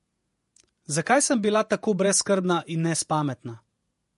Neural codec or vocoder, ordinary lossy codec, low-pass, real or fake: vocoder, 48 kHz, 128 mel bands, Vocos; MP3, 48 kbps; 14.4 kHz; fake